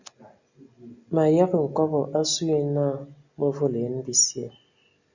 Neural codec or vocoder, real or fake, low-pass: none; real; 7.2 kHz